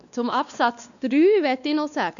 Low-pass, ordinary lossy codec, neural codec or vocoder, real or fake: 7.2 kHz; none; codec, 16 kHz, 2 kbps, X-Codec, WavLM features, trained on Multilingual LibriSpeech; fake